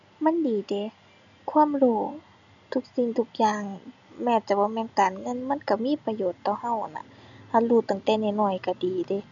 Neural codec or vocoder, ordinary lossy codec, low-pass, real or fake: none; none; 7.2 kHz; real